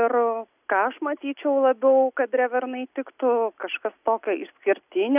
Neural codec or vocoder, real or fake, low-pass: none; real; 3.6 kHz